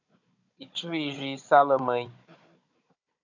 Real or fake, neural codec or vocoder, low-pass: fake; codec, 16 kHz, 16 kbps, FunCodec, trained on Chinese and English, 50 frames a second; 7.2 kHz